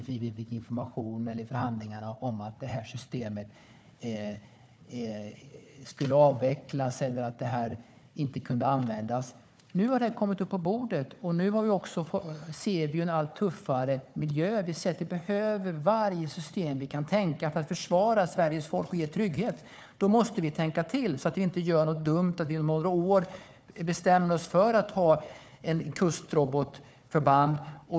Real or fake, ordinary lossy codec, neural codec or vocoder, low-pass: fake; none; codec, 16 kHz, 4 kbps, FunCodec, trained on Chinese and English, 50 frames a second; none